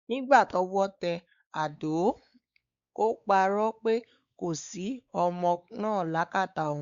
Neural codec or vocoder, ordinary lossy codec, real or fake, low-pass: codec, 16 kHz, 4 kbps, X-Codec, WavLM features, trained on Multilingual LibriSpeech; Opus, 64 kbps; fake; 7.2 kHz